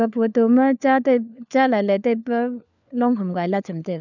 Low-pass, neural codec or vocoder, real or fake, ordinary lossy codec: 7.2 kHz; codec, 16 kHz, 4 kbps, FunCodec, trained on LibriTTS, 50 frames a second; fake; none